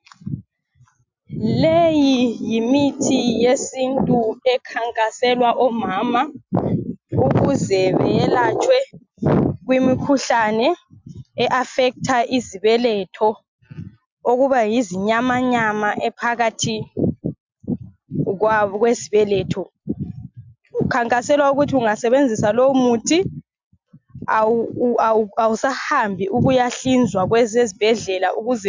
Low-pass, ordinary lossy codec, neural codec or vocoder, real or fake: 7.2 kHz; MP3, 64 kbps; none; real